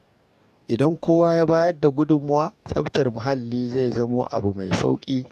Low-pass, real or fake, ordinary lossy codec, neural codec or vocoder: 14.4 kHz; fake; AAC, 96 kbps; codec, 44.1 kHz, 2.6 kbps, DAC